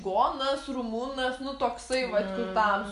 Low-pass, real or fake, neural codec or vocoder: 10.8 kHz; real; none